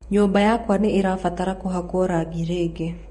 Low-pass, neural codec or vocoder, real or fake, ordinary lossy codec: 19.8 kHz; vocoder, 48 kHz, 128 mel bands, Vocos; fake; MP3, 48 kbps